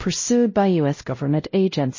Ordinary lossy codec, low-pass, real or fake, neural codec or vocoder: MP3, 32 kbps; 7.2 kHz; fake; codec, 16 kHz, 0.5 kbps, X-Codec, WavLM features, trained on Multilingual LibriSpeech